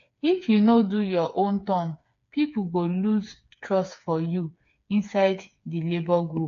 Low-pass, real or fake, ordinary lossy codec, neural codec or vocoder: 7.2 kHz; fake; AAC, 48 kbps; codec, 16 kHz, 4 kbps, FreqCodec, smaller model